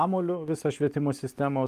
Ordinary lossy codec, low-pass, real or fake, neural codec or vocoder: Opus, 32 kbps; 14.4 kHz; real; none